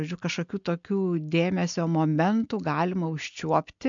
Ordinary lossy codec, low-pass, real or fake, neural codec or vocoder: MP3, 64 kbps; 7.2 kHz; real; none